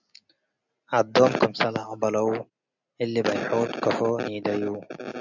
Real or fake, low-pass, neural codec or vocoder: real; 7.2 kHz; none